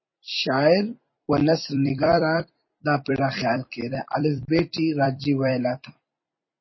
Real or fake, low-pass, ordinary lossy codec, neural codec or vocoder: fake; 7.2 kHz; MP3, 24 kbps; vocoder, 44.1 kHz, 128 mel bands every 256 samples, BigVGAN v2